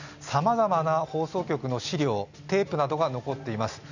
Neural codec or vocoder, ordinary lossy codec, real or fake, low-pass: none; none; real; 7.2 kHz